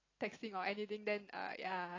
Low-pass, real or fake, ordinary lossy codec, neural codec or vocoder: 7.2 kHz; real; AAC, 32 kbps; none